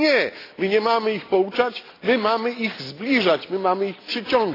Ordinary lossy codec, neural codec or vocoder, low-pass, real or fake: AAC, 24 kbps; none; 5.4 kHz; real